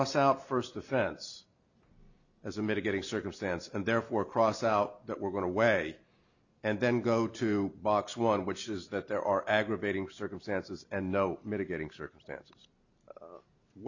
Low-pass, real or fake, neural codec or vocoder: 7.2 kHz; real; none